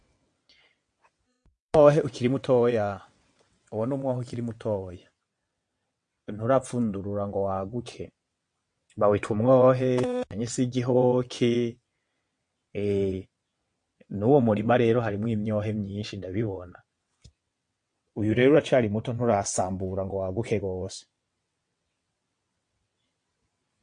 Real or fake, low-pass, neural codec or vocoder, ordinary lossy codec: fake; 9.9 kHz; vocoder, 22.05 kHz, 80 mel bands, WaveNeXt; MP3, 48 kbps